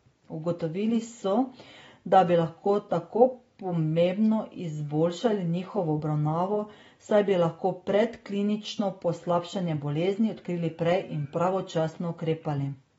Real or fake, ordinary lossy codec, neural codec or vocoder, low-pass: real; AAC, 24 kbps; none; 19.8 kHz